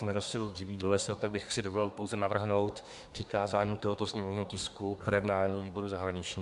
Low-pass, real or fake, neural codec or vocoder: 10.8 kHz; fake; codec, 24 kHz, 1 kbps, SNAC